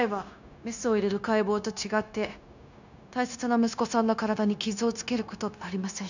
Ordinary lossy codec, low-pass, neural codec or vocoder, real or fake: none; 7.2 kHz; codec, 16 kHz, 0.9 kbps, LongCat-Audio-Codec; fake